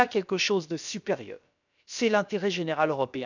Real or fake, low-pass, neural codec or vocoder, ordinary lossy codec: fake; 7.2 kHz; codec, 16 kHz, about 1 kbps, DyCAST, with the encoder's durations; none